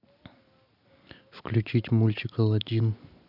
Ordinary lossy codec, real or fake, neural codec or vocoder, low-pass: none; real; none; 5.4 kHz